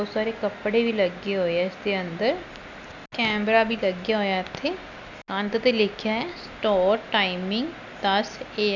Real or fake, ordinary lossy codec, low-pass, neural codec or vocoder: real; none; 7.2 kHz; none